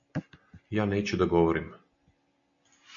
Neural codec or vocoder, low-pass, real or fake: none; 7.2 kHz; real